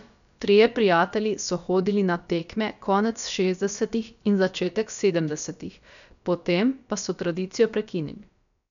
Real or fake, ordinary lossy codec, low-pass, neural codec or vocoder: fake; none; 7.2 kHz; codec, 16 kHz, about 1 kbps, DyCAST, with the encoder's durations